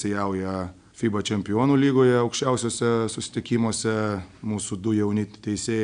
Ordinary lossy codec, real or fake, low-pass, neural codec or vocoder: AAC, 96 kbps; real; 9.9 kHz; none